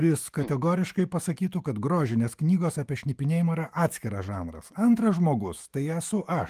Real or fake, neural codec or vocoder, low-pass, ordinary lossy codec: fake; autoencoder, 48 kHz, 128 numbers a frame, DAC-VAE, trained on Japanese speech; 14.4 kHz; Opus, 24 kbps